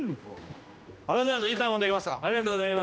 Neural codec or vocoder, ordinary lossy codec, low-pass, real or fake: codec, 16 kHz, 1 kbps, X-Codec, HuBERT features, trained on general audio; none; none; fake